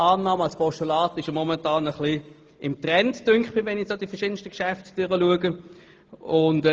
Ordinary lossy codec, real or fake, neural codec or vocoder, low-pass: Opus, 24 kbps; real; none; 7.2 kHz